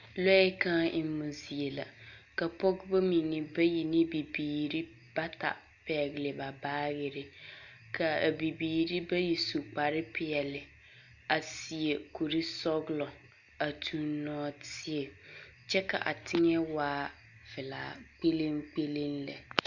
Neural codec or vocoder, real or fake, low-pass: none; real; 7.2 kHz